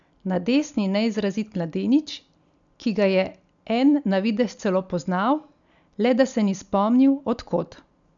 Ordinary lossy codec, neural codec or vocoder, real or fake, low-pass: MP3, 96 kbps; none; real; 7.2 kHz